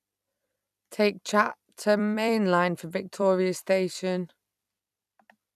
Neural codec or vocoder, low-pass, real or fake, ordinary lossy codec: vocoder, 44.1 kHz, 128 mel bands every 256 samples, BigVGAN v2; 14.4 kHz; fake; none